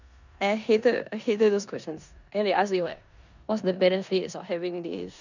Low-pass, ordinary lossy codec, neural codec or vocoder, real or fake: 7.2 kHz; none; codec, 16 kHz in and 24 kHz out, 0.9 kbps, LongCat-Audio-Codec, four codebook decoder; fake